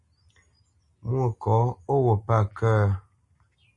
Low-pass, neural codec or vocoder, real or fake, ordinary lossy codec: 10.8 kHz; none; real; MP3, 48 kbps